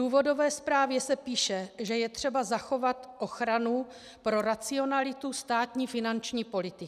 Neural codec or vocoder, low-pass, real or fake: none; 14.4 kHz; real